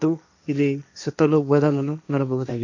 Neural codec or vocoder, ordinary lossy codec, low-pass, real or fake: codec, 16 kHz, 1.1 kbps, Voila-Tokenizer; none; 7.2 kHz; fake